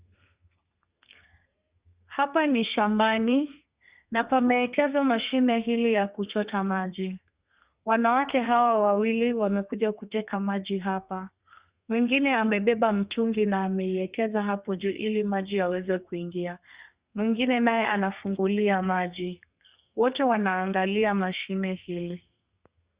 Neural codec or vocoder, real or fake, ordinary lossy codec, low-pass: codec, 32 kHz, 1.9 kbps, SNAC; fake; Opus, 64 kbps; 3.6 kHz